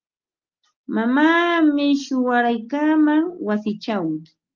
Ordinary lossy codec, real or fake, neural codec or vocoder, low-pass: Opus, 32 kbps; real; none; 7.2 kHz